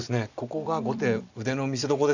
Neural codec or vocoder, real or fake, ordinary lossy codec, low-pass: vocoder, 44.1 kHz, 128 mel bands every 256 samples, BigVGAN v2; fake; none; 7.2 kHz